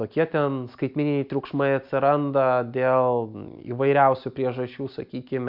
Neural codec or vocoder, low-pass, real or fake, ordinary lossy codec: none; 5.4 kHz; real; MP3, 48 kbps